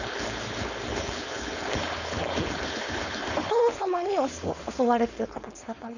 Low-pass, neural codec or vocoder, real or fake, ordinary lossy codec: 7.2 kHz; codec, 16 kHz, 4.8 kbps, FACodec; fake; none